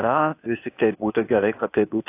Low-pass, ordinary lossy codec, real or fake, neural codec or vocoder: 3.6 kHz; AAC, 24 kbps; fake; codec, 16 kHz, 0.8 kbps, ZipCodec